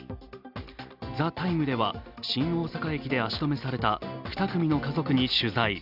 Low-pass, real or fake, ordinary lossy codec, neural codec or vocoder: 5.4 kHz; real; Opus, 64 kbps; none